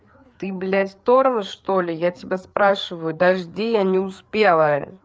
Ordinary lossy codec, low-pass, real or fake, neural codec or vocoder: none; none; fake; codec, 16 kHz, 4 kbps, FreqCodec, larger model